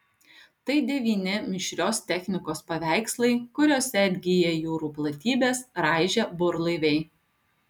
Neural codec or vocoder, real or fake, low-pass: none; real; 19.8 kHz